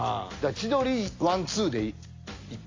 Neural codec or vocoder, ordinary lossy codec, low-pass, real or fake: none; AAC, 32 kbps; 7.2 kHz; real